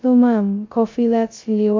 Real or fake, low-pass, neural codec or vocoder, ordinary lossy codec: fake; 7.2 kHz; codec, 16 kHz, 0.2 kbps, FocalCodec; MP3, 48 kbps